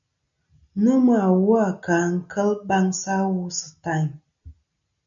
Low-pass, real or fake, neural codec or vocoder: 7.2 kHz; real; none